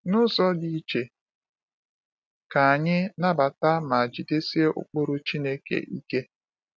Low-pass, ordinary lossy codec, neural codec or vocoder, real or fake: none; none; none; real